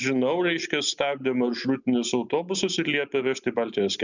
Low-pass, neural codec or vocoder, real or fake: 7.2 kHz; none; real